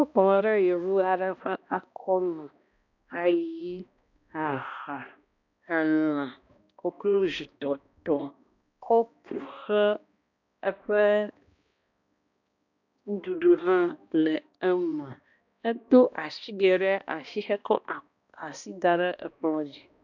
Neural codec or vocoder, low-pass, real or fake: codec, 16 kHz, 1 kbps, X-Codec, HuBERT features, trained on balanced general audio; 7.2 kHz; fake